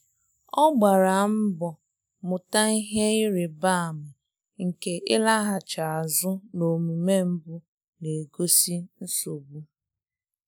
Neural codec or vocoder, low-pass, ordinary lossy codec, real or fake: none; none; none; real